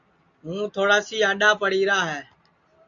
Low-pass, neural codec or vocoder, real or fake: 7.2 kHz; none; real